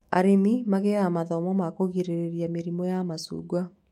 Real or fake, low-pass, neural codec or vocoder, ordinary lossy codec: fake; 19.8 kHz; autoencoder, 48 kHz, 128 numbers a frame, DAC-VAE, trained on Japanese speech; MP3, 64 kbps